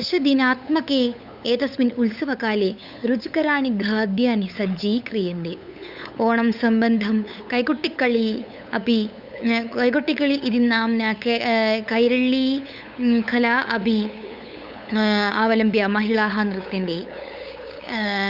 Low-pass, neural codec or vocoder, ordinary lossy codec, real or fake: 5.4 kHz; codec, 16 kHz, 8 kbps, FunCodec, trained on LibriTTS, 25 frames a second; Opus, 64 kbps; fake